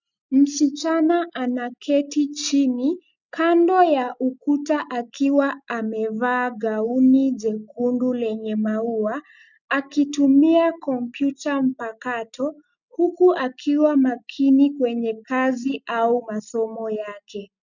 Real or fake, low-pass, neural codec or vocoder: real; 7.2 kHz; none